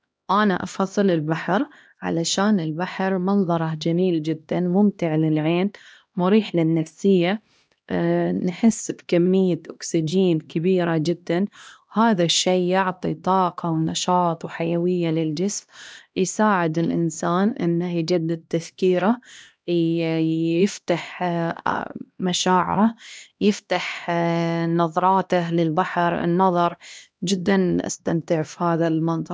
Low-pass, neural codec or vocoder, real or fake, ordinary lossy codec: none; codec, 16 kHz, 1 kbps, X-Codec, HuBERT features, trained on LibriSpeech; fake; none